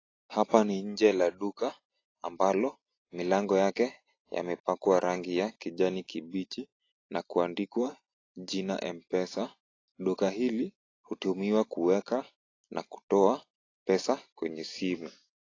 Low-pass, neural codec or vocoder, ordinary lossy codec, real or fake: 7.2 kHz; none; AAC, 32 kbps; real